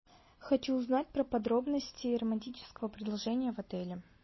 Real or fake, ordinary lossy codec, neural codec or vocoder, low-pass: real; MP3, 24 kbps; none; 7.2 kHz